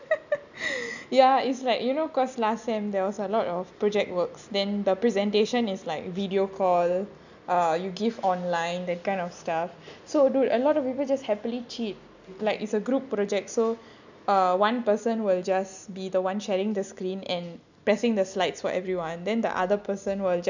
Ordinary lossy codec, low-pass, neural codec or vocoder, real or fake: none; 7.2 kHz; none; real